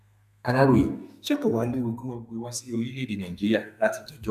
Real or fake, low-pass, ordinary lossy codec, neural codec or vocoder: fake; 14.4 kHz; none; codec, 32 kHz, 1.9 kbps, SNAC